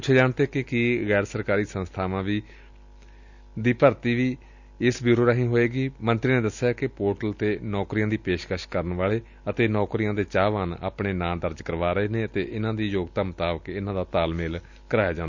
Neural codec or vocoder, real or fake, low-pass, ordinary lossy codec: none; real; 7.2 kHz; none